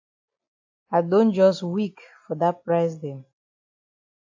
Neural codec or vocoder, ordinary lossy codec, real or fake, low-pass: none; AAC, 48 kbps; real; 7.2 kHz